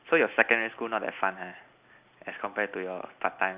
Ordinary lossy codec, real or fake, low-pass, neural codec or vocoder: Opus, 64 kbps; real; 3.6 kHz; none